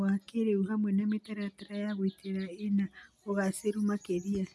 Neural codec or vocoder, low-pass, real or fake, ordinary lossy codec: none; none; real; none